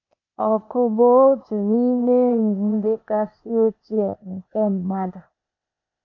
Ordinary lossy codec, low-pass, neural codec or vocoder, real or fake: AAC, 48 kbps; 7.2 kHz; codec, 16 kHz, 0.8 kbps, ZipCodec; fake